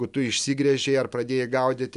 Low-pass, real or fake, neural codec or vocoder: 10.8 kHz; real; none